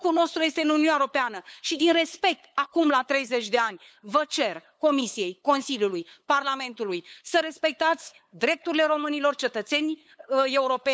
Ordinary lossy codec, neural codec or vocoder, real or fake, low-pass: none; codec, 16 kHz, 8 kbps, FunCodec, trained on LibriTTS, 25 frames a second; fake; none